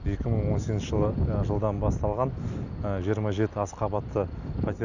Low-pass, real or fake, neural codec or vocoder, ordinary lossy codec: 7.2 kHz; real; none; none